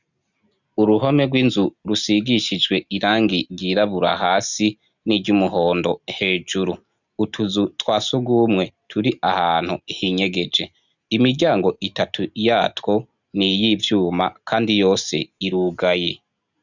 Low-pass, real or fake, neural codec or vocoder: 7.2 kHz; real; none